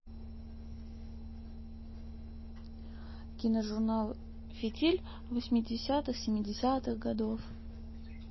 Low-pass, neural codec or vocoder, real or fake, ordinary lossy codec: 7.2 kHz; none; real; MP3, 24 kbps